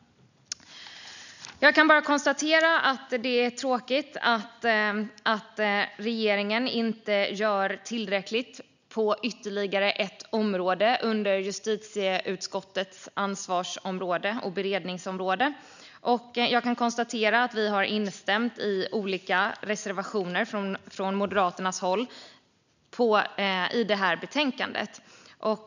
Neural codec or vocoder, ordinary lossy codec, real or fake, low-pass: none; none; real; 7.2 kHz